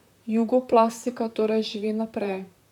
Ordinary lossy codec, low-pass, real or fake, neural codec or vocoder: none; 19.8 kHz; fake; vocoder, 44.1 kHz, 128 mel bands, Pupu-Vocoder